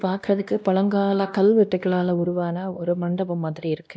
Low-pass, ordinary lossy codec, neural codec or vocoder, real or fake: none; none; codec, 16 kHz, 1 kbps, X-Codec, WavLM features, trained on Multilingual LibriSpeech; fake